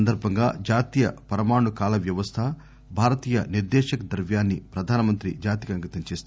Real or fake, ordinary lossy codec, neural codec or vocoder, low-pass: real; none; none; none